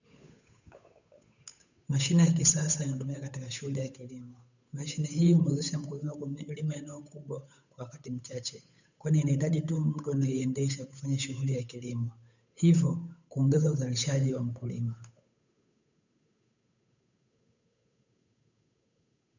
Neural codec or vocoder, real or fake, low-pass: codec, 16 kHz, 8 kbps, FunCodec, trained on Chinese and English, 25 frames a second; fake; 7.2 kHz